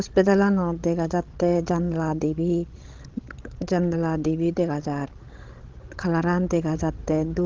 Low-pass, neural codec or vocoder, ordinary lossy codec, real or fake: 7.2 kHz; codec, 16 kHz, 16 kbps, FreqCodec, larger model; Opus, 16 kbps; fake